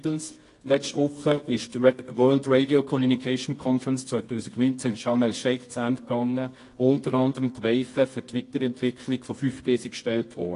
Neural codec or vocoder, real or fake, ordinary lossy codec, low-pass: codec, 24 kHz, 0.9 kbps, WavTokenizer, medium music audio release; fake; AAC, 48 kbps; 10.8 kHz